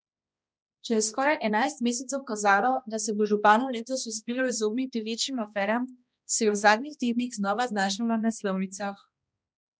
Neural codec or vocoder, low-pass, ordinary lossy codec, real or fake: codec, 16 kHz, 1 kbps, X-Codec, HuBERT features, trained on balanced general audio; none; none; fake